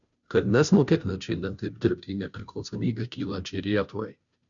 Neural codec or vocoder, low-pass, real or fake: codec, 16 kHz, 0.5 kbps, FunCodec, trained on Chinese and English, 25 frames a second; 7.2 kHz; fake